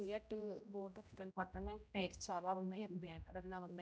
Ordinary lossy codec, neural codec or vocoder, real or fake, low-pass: none; codec, 16 kHz, 0.5 kbps, X-Codec, HuBERT features, trained on general audio; fake; none